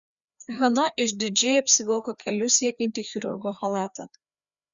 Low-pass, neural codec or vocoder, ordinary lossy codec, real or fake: 7.2 kHz; codec, 16 kHz, 2 kbps, FreqCodec, larger model; Opus, 64 kbps; fake